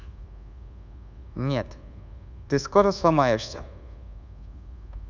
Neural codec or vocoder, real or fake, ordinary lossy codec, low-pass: codec, 24 kHz, 1.2 kbps, DualCodec; fake; none; 7.2 kHz